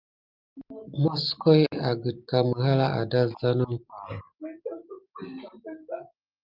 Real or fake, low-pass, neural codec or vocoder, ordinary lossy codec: real; 5.4 kHz; none; Opus, 32 kbps